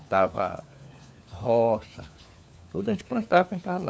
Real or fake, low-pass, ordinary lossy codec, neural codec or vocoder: fake; none; none; codec, 16 kHz, 4 kbps, FunCodec, trained on LibriTTS, 50 frames a second